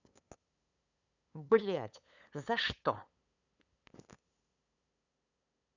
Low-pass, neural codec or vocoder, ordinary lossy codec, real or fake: 7.2 kHz; codec, 16 kHz, 8 kbps, FunCodec, trained on LibriTTS, 25 frames a second; none; fake